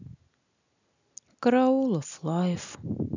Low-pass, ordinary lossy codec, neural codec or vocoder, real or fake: 7.2 kHz; none; none; real